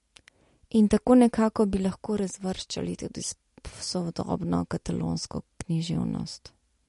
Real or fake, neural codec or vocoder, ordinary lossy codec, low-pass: real; none; MP3, 48 kbps; 14.4 kHz